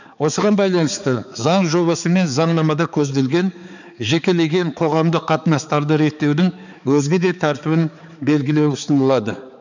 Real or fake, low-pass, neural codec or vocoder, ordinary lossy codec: fake; 7.2 kHz; codec, 16 kHz, 4 kbps, X-Codec, HuBERT features, trained on balanced general audio; none